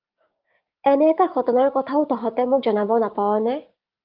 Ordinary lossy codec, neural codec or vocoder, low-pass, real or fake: Opus, 24 kbps; codec, 16 kHz, 6 kbps, DAC; 5.4 kHz; fake